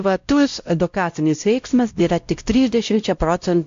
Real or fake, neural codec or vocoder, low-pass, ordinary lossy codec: fake; codec, 16 kHz, 0.5 kbps, X-Codec, WavLM features, trained on Multilingual LibriSpeech; 7.2 kHz; AAC, 64 kbps